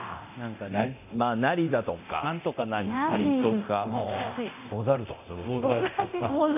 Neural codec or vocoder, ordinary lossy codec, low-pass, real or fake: codec, 24 kHz, 0.9 kbps, DualCodec; none; 3.6 kHz; fake